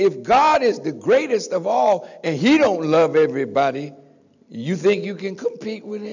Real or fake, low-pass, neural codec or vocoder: real; 7.2 kHz; none